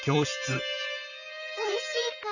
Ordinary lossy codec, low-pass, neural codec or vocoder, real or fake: none; 7.2 kHz; vocoder, 44.1 kHz, 128 mel bands, Pupu-Vocoder; fake